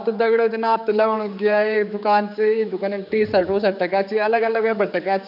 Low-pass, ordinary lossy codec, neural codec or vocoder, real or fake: 5.4 kHz; none; codec, 16 kHz, 4 kbps, X-Codec, HuBERT features, trained on general audio; fake